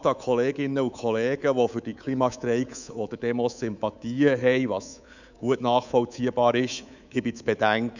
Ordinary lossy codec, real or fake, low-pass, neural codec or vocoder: none; fake; 7.2 kHz; codec, 24 kHz, 3.1 kbps, DualCodec